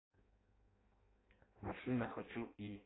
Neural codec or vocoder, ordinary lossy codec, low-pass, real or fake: codec, 16 kHz in and 24 kHz out, 0.6 kbps, FireRedTTS-2 codec; AAC, 16 kbps; 7.2 kHz; fake